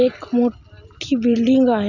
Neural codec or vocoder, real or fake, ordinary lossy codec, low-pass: none; real; none; 7.2 kHz